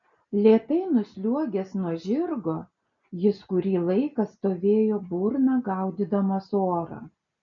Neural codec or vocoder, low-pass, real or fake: none; 7.2 kHz; real